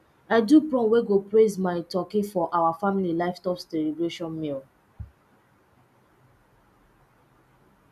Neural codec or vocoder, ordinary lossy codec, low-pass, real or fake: none; none; 14.4 kHz; real